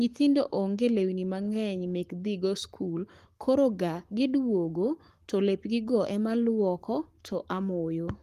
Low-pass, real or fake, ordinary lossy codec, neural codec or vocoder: 14.4 kHz; fake; Opus, 24 kbps; codec, 44.1 kHz, 7.8 kbps, Pupu-Codec